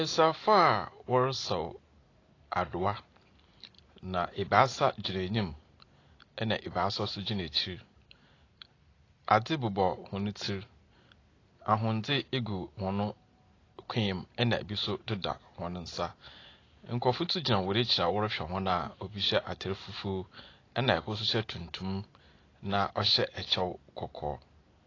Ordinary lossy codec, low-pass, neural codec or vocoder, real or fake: AAC, 32 kbps; 7.2 kHz; none; real